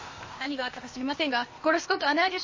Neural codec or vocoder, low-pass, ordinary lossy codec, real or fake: codec, 16 kHz, 0.8 kbps, ZipCodec; 7.2 kHz; MP3, 32 kbps; fake